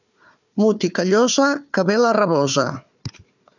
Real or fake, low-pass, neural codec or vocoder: fake; 7.2 kHz; codec, 16 kHz, 4 kbps, FunCodec, trained on Chinese and English, 50 frames a second